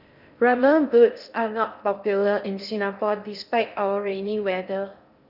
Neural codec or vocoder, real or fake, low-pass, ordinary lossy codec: codec, 16 kHz in and 24 kHz out, 0.8 kbps, FocalCodec, streaming, 65536 codes; fake; 5.4 kHz; none